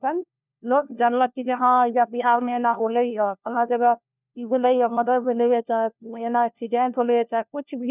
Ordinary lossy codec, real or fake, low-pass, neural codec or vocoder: none; fake; 3.6 kHz; codec, 16 kHz, 1 kbps, FunCodec, trained on LibriTTS, 50 frames a second